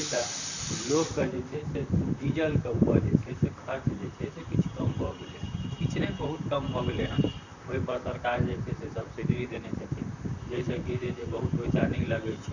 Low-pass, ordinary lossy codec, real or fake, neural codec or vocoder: 7.2 kHz; none; fake; vocoder, 44.1 kHz, 128 mel bands, Pupu-Vocoder